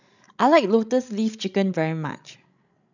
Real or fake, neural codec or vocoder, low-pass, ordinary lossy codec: fake; codec, 16 kHz, 16 kbps, FreqCodec, larger model; 7.2 kHz; none